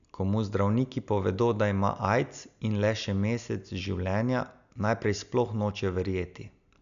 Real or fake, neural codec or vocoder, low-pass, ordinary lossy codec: real; none; 7.2 kHz; none